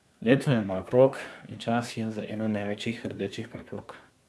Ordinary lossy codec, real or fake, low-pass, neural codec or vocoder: none; fake; none; codec, 24 kHz, 1 kbps, SNAC